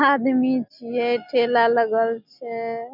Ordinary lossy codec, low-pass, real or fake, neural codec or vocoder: none; 5.4 kHz; real; none